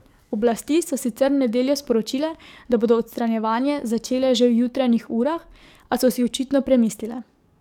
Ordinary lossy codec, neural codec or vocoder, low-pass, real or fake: none; codec, 44.1 kHz, 7.8 kbps, DAC; 19.8 kHz; fake